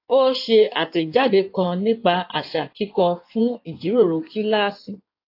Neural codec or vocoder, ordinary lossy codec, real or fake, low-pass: codec, 16 kHz in and 24 kHz out, 1.1 kbps, FireRedTTS-2 codec; AAC, 32 kbps; fake; 5.4 kHz